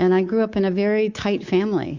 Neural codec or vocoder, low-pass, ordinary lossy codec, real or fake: codec, 24 kHz, 3.1 kbps, DualCodec; 7.2 kHz; Opus, 64 kbps; fake